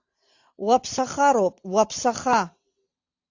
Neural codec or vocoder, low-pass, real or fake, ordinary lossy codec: none; 7.2 kHz; real; AAC, 48 kbps